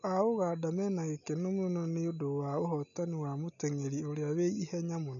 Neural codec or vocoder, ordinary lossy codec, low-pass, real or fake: none; none; 7.2 kHz; real